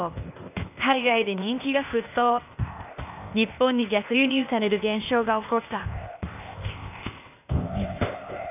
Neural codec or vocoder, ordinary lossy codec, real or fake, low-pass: codec, 16 kHz, 0.8 kbps, ZipCodec; none; fake; 3.6 kHz